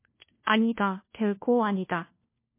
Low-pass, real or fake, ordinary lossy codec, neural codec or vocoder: 3.6 kHz; fake; MP3, 24 kbps; codec, 16 kHz, 1 kbps, FunCodec, trained on LibriTTS, 50 frames a second